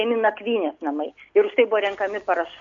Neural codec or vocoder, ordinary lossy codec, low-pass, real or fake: none; AAC, 64 kbps; 7.2 kHz; real